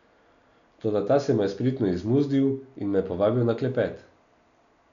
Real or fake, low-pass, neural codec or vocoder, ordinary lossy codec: real; 7.2 kHz; none; none